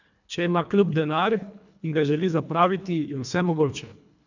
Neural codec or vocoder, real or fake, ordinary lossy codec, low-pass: codec, 24 kHz, 1.5 kbps, HILCodec; fake; none; 7.2 kHz